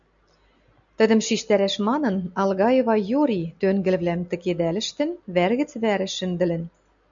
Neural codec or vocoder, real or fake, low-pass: none; real; 7.2 kHz